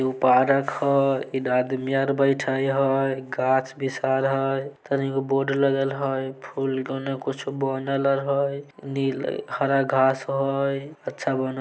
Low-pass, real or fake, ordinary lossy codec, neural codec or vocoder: none; real; none; none